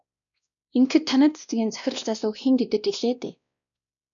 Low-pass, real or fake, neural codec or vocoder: 7.2 kHz; fake; codec, 16 kHz, 1 kbps, X-Codec, WavLM features, trained on Multilingual LibriSpeech